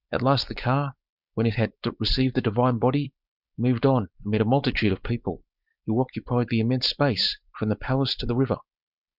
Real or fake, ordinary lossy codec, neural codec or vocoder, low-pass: fake; Opus, 64 kbps; codec, 16 kHz, 4.8 kbps, FACodec; 5.4 kHz